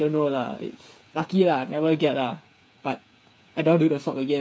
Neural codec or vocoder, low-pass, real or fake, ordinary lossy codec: codec, 16 kHz, 8 kbps, FreqCodec, smaller model; none; fake; none